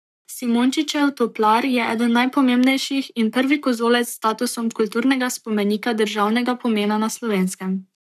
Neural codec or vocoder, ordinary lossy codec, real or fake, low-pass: codec, 44.1 kHz, 7.8 kbps, Pupu-Codec; none; fake; 14.4 kHz